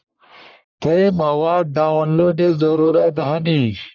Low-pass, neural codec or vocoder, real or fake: 7.2 kHz; codec, 44.1 kHz, 1.7 kbps, Pupu-Codec; fake